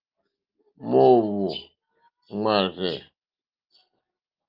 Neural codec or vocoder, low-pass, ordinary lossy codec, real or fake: none; 5.4 kHz; Opus, 24 kbps; real